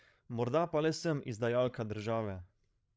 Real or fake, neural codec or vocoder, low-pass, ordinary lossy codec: fake; codec, 16 kHz, 16 kbps, FreqCodec, larger model; none; none